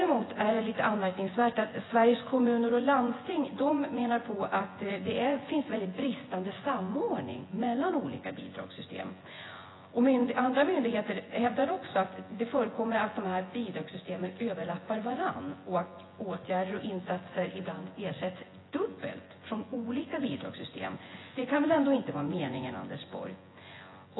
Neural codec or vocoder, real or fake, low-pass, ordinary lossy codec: vocoder, 24 kHz, 100 mel bands, Vocos; fake; 7.2 kHz; AAC, 16 kbps